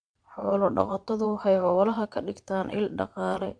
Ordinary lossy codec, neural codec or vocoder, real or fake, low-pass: MP3, 64 kbps; vocoder, 22.05 kHz, 80 mel bands, Vocos; fake; 9.9 kHz